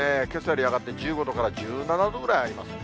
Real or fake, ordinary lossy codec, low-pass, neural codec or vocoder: real; none; none; none